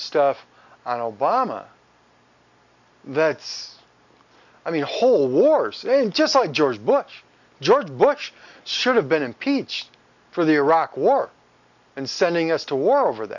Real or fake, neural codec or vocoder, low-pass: real; none; 7.2 kHz